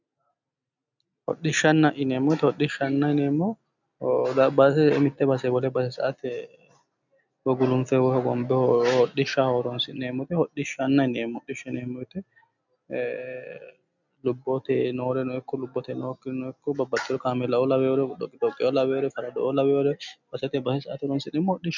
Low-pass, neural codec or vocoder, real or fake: 7.2 kHz; none; real